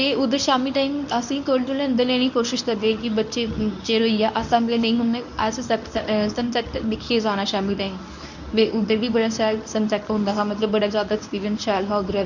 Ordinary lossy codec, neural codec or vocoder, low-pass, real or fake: none; codec, 24 kHz, 0.9 kbps, WavTokenizer, medium speech release version 1; 7.2 kHz; fake